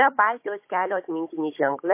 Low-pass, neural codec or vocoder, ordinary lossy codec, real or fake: 3.6 kHz; codec, 16 kHz, 16 kbps, FunCodec, trained on Chinese and English, 50 frames a second; MP3, 24 kbps; fake